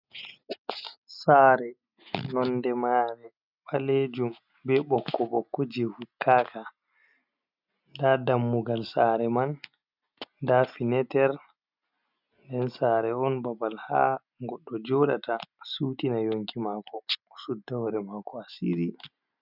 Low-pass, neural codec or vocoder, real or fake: 5.4 kHz; none; real